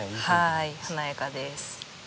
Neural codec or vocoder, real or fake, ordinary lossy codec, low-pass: none; real; none; none